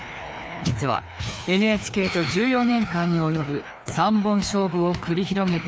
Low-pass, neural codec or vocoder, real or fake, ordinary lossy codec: none; codec, 16 kHz, 2 kbps, FreqCodec, larger model; fake; none